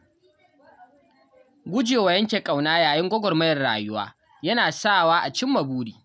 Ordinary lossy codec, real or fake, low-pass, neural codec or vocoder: none; real; none; none